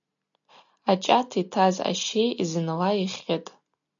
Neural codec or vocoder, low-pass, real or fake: none; 7.2 kHz; real